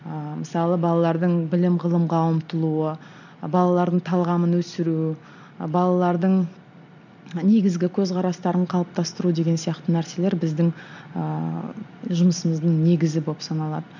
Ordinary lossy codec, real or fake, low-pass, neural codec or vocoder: none; real; 7.2 kHz; none